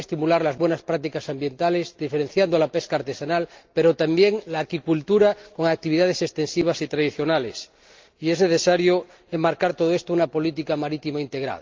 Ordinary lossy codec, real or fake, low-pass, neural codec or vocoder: Opus, 24 kbps; real; 7.2 kHz; none